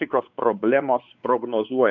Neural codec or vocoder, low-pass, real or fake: codec, 16 kHz, 4 kbps, X-Codec, WavLM features, trained on Multilingual LibriSpeech; 7.2 kHz; fake